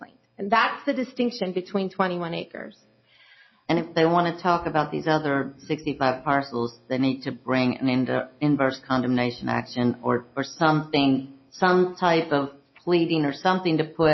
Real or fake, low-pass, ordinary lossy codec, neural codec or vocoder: real; 7.2 kHz; MP3, 24 kbps; none